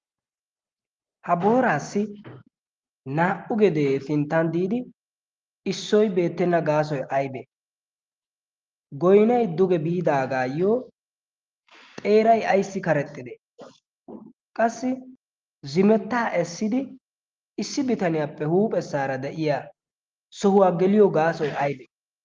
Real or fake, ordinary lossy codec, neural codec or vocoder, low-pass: real; Opus, 32 kbps; none; 7.2 kHz